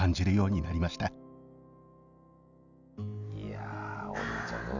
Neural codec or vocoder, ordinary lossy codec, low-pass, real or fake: none; none; 7.2 kHz; real